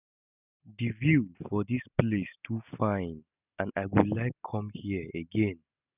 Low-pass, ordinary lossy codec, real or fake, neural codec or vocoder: 3.6 kHz; none; real; none